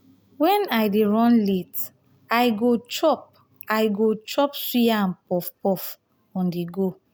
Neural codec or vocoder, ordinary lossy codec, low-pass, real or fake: none; none; none; real